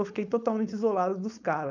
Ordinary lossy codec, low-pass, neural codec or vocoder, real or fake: none; 7.2 kHz; codec, 16 kHz, 4.8 kbps, FACodec; fake